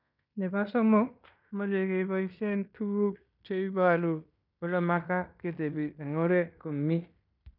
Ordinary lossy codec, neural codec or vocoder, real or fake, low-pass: none; codec, 16 kHz in and 24 kHz out, 0.9 kbps, LongCat-Audio-Codec, fine tuned four codebook decoder; fake; 5.4 kHz